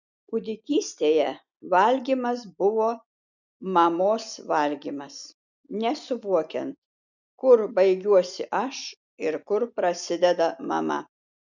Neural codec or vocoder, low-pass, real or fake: none; 7.2 kHz; real